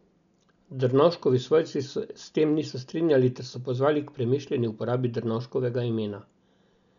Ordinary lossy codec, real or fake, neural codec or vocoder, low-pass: none; real; none; 7.2 kHz